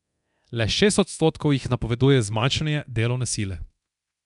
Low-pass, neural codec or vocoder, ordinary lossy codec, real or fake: 10.8 kHz; codec, 24 kHz, 0.9 kbps, DualCodec; none; fake